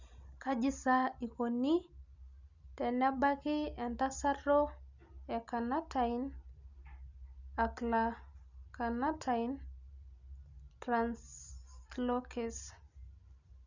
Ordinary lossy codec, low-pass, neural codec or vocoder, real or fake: none; 7.2 kHz; none; real